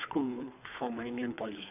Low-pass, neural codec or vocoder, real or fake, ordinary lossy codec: 3.6 kHz; codec, 24 kHz, 3 kbps, HILCodec; fake; none